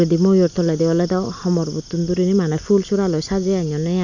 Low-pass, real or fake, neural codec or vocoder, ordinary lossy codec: 7.2 kHz; real; none; none